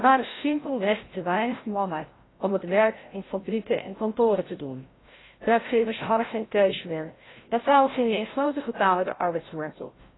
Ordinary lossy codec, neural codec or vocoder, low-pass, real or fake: AAC, 16 kbps; codec, 16 kHz, 0.5 kbps, FreqCodec, larger model; 7.2 kHz; fake